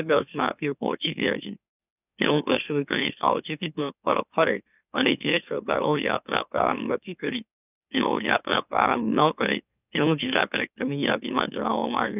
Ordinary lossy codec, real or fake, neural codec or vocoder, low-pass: none; fake; autoencoder, 44.1 kHz, a latent of 192 numbers a frame, MeloTTS; 3.6 kHz